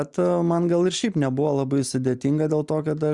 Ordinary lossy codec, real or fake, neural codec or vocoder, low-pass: Opus, 64 kbps; real; none; 10.8 kHz